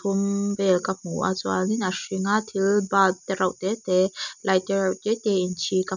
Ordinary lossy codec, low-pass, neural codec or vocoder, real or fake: none; 7.2 kHz; none; real